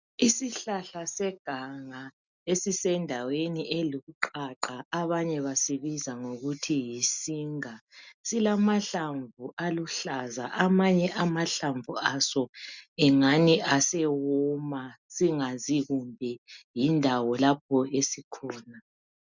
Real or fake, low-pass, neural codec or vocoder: real; 7.2 kHz; none